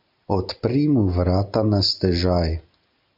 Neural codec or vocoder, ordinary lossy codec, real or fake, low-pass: none; MP3, 48 kbps; real; 5.4 kHz